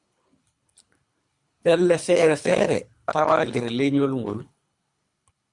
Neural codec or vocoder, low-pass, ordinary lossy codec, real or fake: codec, 24 kHz, 3 kbps, HILCodec; 10.8 kHz; Opus, 64 kbps; fake